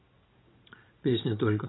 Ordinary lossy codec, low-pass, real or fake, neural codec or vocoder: AAC, 16 kbps; 7.2 kHz; real; none